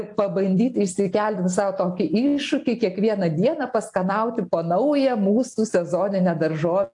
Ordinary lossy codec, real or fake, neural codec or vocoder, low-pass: AAC, 64 kbps; real; none; 10.8 kHz